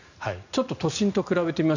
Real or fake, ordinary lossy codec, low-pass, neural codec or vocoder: real; none; 7.2 kHz; none